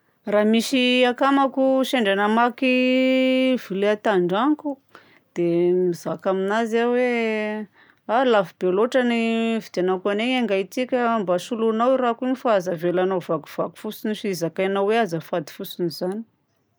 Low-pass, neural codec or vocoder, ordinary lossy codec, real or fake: none; none; none; real